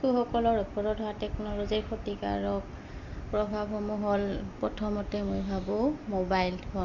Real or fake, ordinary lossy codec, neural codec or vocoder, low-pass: real; none; none; 7.2 kHz